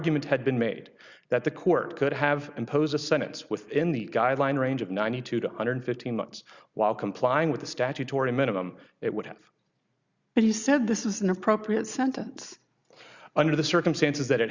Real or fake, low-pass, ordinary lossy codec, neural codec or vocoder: real; 7.2 kHz; Opus, 64 kbps; none